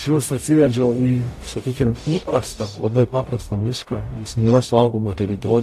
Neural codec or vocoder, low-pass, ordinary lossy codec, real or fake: codec, 44.1 kHz, 0.9 kbps, DAC; 14.4 kHz; AAC, 64 kbps; fake